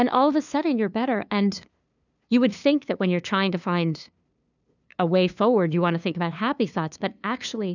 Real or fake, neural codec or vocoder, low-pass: fake; codec, 16 kHz, 2 kbps, FunCodec, trained on LibriTTS, 25 frames a second; 7.2 kHz